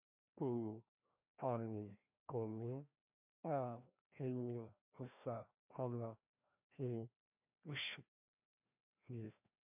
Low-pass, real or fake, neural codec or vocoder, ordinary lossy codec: 3.6 kHz; fake; codec, 16 kHz, 1 kbps, FreqCodec, larger model; MP3, 32 kbps